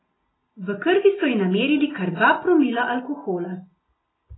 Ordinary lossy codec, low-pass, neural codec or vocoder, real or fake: AAC, 16 kbps; 7.2 kHz; none; real